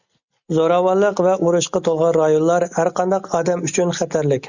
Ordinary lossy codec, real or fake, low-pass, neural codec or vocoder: Opus, 64 kbps; real; 7.2 kHz; none